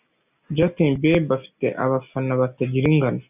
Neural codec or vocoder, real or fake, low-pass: none; real; 3.6 kHz